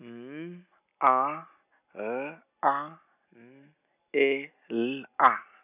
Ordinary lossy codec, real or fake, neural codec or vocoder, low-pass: none; real; none; 3.6 kHz